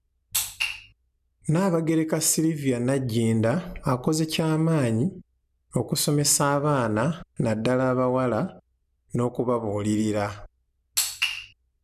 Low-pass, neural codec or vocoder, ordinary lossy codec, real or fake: 14.4 kHz; none; none; real